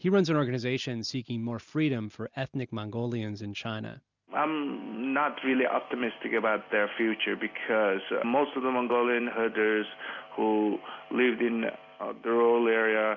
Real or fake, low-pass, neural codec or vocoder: real; 7.2 kHz; none